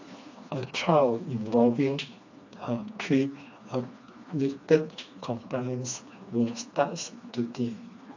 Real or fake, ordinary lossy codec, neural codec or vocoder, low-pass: fake; AAC, 48 kbps; codec, 16 kHz, 2 kbps, FreqCodec, smaller model; 7.2 kHz